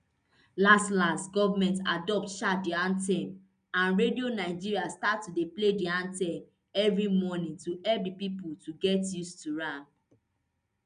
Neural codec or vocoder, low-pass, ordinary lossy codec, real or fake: none; 9.9 kHz; none; real